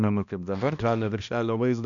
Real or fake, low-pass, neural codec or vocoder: fake; 7.2 kHz; codec, 16 kHz, 1 kbps, X-Codec, HuBERT features, trained on balanced general audio